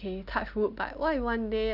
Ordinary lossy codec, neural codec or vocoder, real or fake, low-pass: none; none; real; 5.4 kHz